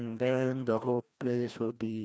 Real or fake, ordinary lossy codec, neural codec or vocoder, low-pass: fake; none; codec, 16 kHz, 1 kbps, FreqCodec, larger model; none